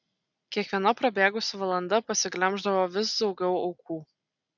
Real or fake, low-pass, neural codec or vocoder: real; 7.2 kHz; none